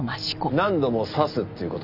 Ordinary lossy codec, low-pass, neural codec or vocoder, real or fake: none; 5.4 kHz; none; real